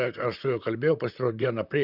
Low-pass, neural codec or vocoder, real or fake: 5.4 kHz; vocoder, 44.1 kHz, 128 mel bands, Pupu-Vocoder; fake